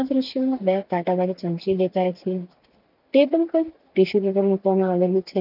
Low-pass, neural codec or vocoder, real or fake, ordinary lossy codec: 5.4 kHz; none; real; none